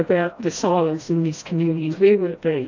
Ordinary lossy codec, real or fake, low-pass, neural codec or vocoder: AAC, 48 kbps; fake; 7.2 kHz; codec, 16 kHz, 1 kbps, FreqCodec, smaller model